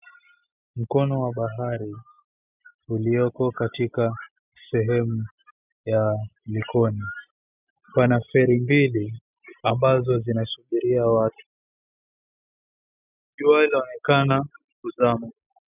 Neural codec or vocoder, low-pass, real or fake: none; 3.6 kHz; real